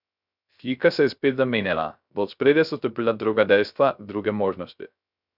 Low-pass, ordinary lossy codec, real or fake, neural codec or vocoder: 5.4 kHz; none; fake; codec, 16 kHz, 0.3 kbps, FocalCodec